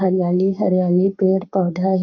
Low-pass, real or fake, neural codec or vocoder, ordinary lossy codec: 7.2 kHz; fake; codec, 44.1 kHz, 7.8 kbps, Pupu-Codec; none